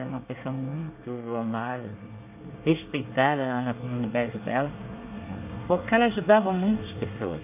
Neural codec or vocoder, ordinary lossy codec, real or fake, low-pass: codec, 24 kHz, 1 kbps, SNAC; none; fake; 3.6 kHz